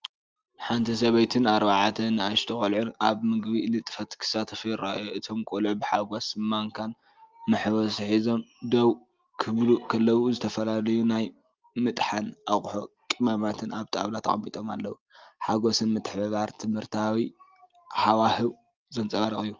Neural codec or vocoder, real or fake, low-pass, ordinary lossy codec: none; real; 7.2 kHz; Opus, 24 kbps